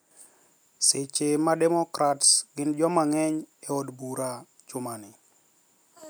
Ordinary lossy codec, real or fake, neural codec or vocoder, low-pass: none; real; none; none